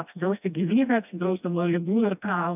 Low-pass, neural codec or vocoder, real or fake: 3.6 kHz; codec, 16 kHz, 1 kbps, FreqCodec, smaller model; fake